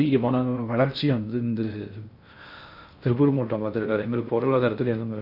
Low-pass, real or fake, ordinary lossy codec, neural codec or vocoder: 5.4 kHz; fake; none; codec, 16 kHz in and 24 kHz out, 0.6 kbps, FocalCodec, streaming, 4096 codes